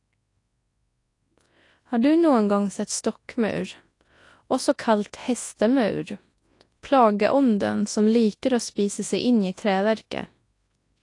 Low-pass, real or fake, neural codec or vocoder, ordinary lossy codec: 10.8 kHz; fake; codec, 24 kHz, 0.9 kbps, WavTokenizer, large speech release; AAC, 48 kbps